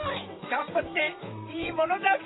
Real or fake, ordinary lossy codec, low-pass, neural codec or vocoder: fake; AAC, 16 kbps; 7.2 kHz; vocoder, 44.1 kHz, 128 mel bands, Pupu-Vocoder